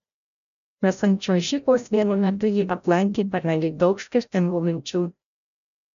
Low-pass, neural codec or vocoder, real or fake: 7.2 kHz; codec, 16 kHz, 0.5 kbps, FreqCodec, larger model; fake